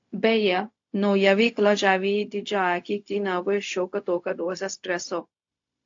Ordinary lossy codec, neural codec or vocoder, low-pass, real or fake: AAC, 48 kbps; codec, 16 kHz, 0.4 kbps, LongCat-Audio-Codec; 7.2 kHz; fake